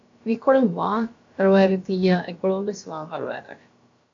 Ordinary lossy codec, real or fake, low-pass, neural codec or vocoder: AAC, 48 kbps; fake; 7.2 kHz; codec, 16 kHz, about 1 kbps, DyCAST, with the encoder's durations